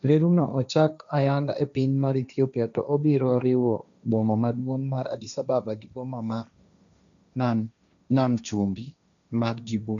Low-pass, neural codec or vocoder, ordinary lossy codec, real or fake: 7.2 kHz; codec, 16 kHz, 1.1 kbps, Voila-Tokenizer; none; fake